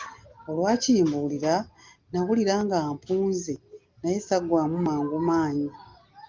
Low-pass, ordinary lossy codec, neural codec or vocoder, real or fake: 7.2 kHz; Opus, 32 kbps; none; real